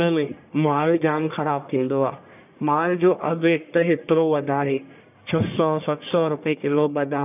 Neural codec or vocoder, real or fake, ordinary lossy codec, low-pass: codec, 44.1 kHz, 1.7 kbps, Pupu-Codec; fake; none; 3.6 kHz